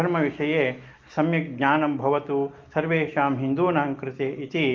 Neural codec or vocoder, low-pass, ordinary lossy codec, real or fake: none; 7.2 kHz; Opus, 24 kbps; real